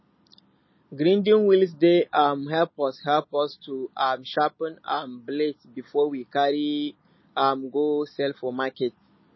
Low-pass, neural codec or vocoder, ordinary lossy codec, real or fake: 7.2 kHz; none; MP3, 24 kbps; real